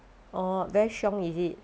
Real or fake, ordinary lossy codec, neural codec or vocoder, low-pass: real; none; none; none